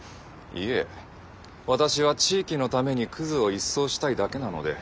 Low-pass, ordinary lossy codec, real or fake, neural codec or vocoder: none; none; real; none